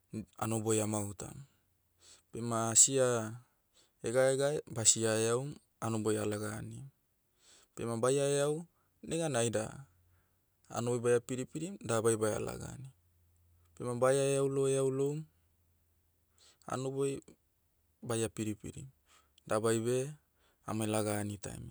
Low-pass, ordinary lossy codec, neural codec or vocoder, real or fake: none; none; none; real